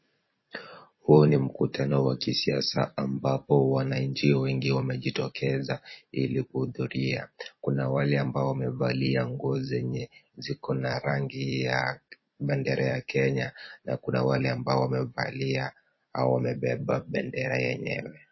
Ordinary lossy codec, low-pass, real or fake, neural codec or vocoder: MP3, 24 kbps; 7.2 kHz; fake; vocoder, 44.1 kHz, 128 mel bands every 256 samples, BigVGAN v2